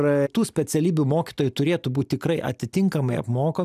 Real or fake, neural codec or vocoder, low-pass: real; none; 14.4 kHz